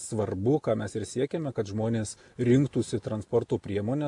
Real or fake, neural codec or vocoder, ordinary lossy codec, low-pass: real; none; AAC, 64 kbps; 10.8 kHz